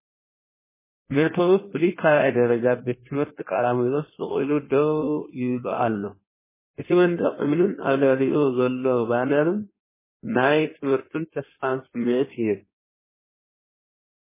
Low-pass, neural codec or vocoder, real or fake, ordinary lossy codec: 3.6 kHz; codec, 16 kHz in and 24 kHz out, 1.1 kbps, FireRedTTS-2 codec; fake; MP3, 16 kbps